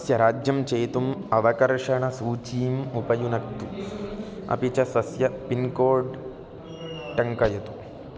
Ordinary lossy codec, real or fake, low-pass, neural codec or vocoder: none; real; none; none